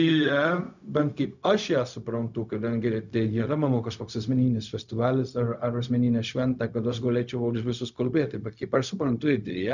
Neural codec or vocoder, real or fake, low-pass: codec, 16 kHz, 0.4 kbps, LongCat-Audio-Codec; fake; 7.2 kHz